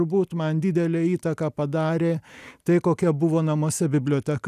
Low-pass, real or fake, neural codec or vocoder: 14.4 kHz; real; none